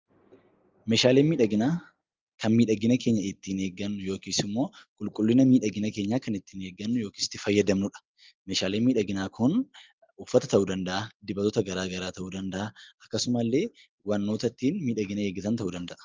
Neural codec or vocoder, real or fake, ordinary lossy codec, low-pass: none; real; Opus, 24 kbps; 7.2 kHz